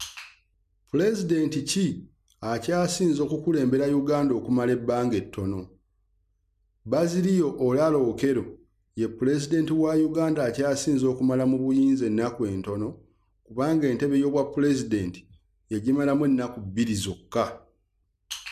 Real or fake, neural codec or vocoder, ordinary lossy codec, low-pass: real; none; MP3, 96 kbps; 14.4 kHz